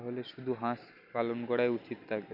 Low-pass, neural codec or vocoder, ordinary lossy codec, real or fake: 5.4 kHz; none; none; real